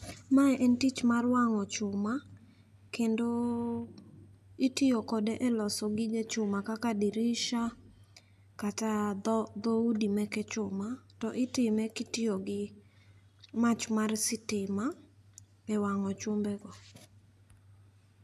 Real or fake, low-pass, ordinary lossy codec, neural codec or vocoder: real; 14.4 kHz; none; none